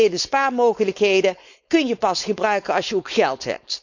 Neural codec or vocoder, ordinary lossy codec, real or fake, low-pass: codec, 16 kHz, 4.8 kbps, FACodec; none; fake; 7.2 kHz